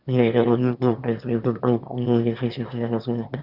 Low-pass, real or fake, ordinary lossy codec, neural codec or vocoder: 5.4 kHz; fake; none; autoencoder, 22.05 kHz, a latent of 192 numbers a frame, VITS, trained on one speaker